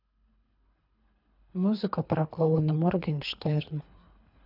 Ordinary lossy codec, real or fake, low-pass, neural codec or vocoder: none; fake; 5.4 kHz; codec, 24 kHz, 3 kbps, HILCodec